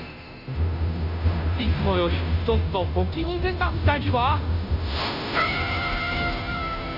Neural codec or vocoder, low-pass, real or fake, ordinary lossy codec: codec, 16 kHz, 0.5 kbps, FunCodec, trained on Chinese and English, 25 frames a second; 5.4 kHz; fake; none